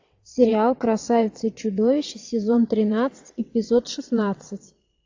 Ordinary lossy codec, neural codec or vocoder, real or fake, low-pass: AAC, 48 kbps; vocoder, 22.05 kHz, 80 mel bands, WaveNeXt; fake; 7.2 kHz